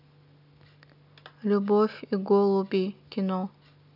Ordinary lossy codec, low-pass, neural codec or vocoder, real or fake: none; 5.4 kHz; none; real